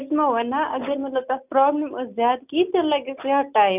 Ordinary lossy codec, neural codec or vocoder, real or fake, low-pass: none; none; real; 3.6 kHz